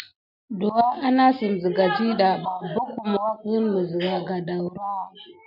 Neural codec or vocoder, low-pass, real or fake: none; 5.4 kHz; real